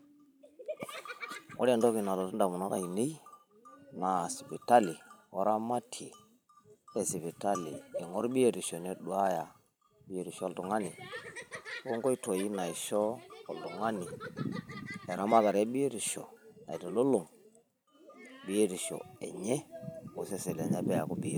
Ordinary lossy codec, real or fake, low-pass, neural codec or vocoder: none; fake; none; vocoder, 44.1 kHz, 128 mel bands every 512 samples, BigVGAN v2